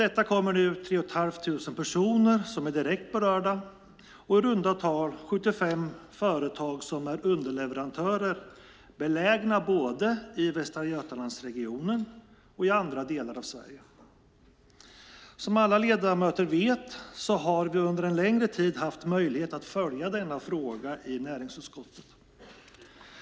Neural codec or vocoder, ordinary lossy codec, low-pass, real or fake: none; none; none; real